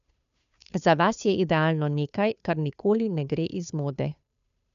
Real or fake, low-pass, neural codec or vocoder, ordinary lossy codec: fake; 7.2 kHz; codec, 16 kHz, 2 kbps, FunCodec, trained on Chinese and English, 25 frames a second; MP3, 96 kbps